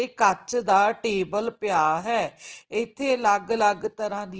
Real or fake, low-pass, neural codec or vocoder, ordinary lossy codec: real; 7.2 kHz; none; Opus, 16 kbps